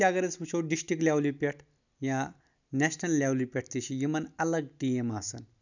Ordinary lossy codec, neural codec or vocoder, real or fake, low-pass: none; none; real; 7.2 kHz